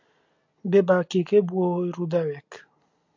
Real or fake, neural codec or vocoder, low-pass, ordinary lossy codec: real; none; 7.2 kHz; AAC, 48 kbps